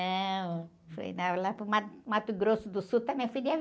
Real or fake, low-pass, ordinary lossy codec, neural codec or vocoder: real; none; none; none